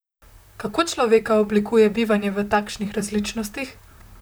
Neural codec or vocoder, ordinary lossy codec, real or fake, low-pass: vocoder, 44.1 kHz, 128 mel bands, Pupu-Vocoder; none; fake; none